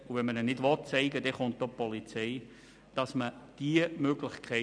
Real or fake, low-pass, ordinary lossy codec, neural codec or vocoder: real; 9.9 kHz; none; none